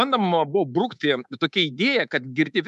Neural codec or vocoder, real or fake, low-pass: codec, 24 kHz, 3.1 kbps, DualCodec; fake; 10.8 kHz